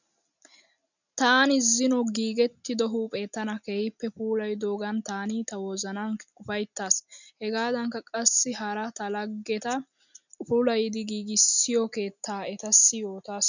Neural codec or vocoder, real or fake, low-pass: none; real; 7.2 kHz